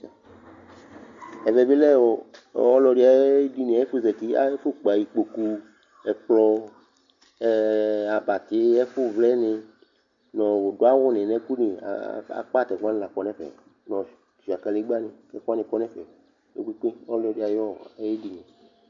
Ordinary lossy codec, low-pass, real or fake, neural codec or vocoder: MP3, 64 kbps; 7.2 kHz; real; none